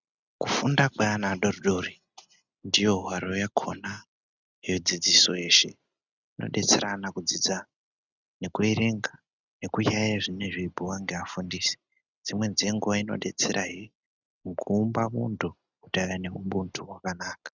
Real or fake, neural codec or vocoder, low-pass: real; none; 7.2 kHz